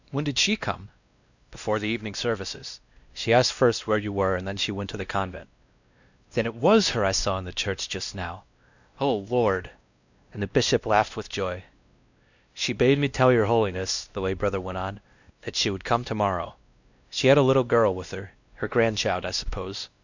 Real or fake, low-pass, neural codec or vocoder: fake; 7.2 kHz; codec, 16 kHz, 1 kbps, X-Codec, WavLM features, trained on Multilingual LibriSpeech